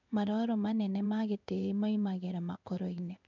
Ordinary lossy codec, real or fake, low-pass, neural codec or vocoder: none; fake; 7.2 kHz; codec, 16 kHz in and 24 kHz out, 1 kbps, XY-Tokenizer